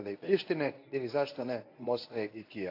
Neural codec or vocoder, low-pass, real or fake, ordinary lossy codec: codec, 24 kHz, 0.9 kbps, WavTokenizer, medium speech release version 1; 5.4 kHz; fake; AAC, 32 kbps